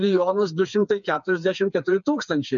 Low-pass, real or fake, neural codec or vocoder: 7.2 kHz; fake; codec, 16 kHz, 4 kbps, FreqCodec, smaller model